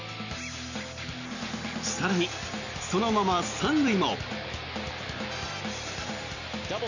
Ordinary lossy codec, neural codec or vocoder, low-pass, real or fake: none; none; 7.2 kHz; real